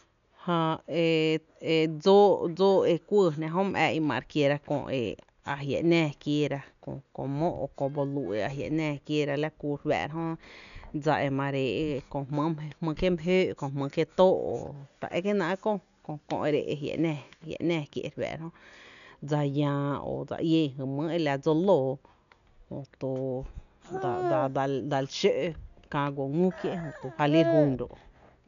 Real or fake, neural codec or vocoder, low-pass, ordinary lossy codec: real; none; 7.2 kHz; none